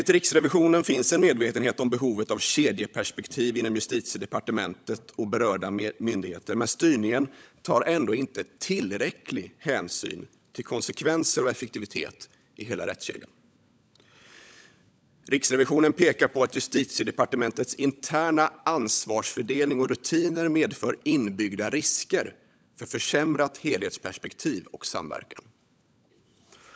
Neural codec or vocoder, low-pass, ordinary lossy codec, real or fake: codec, 16 kHz, 16 kbps, FunCodec, trained on LibriTTS, 50 frames a second; none; none; fake